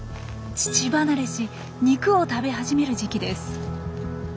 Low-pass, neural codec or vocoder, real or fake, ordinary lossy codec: none; none; real; none